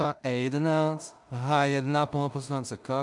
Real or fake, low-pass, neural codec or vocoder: fake; 10.8 kHz; codec, 16 kHz in and 24 kHz out, 0.4 kbps, LongCat-Audio-Codec, two codebook decoder